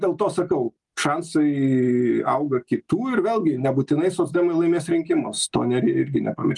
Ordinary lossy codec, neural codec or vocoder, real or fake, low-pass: Opus, 32 kbps; none; real; 10.8 kHz